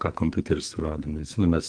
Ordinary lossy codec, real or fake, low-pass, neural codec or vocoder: Opus, 24 kbps; fake; 9.9 kHz; codec, 44.1 kHz, 2.6 kbps, SNAC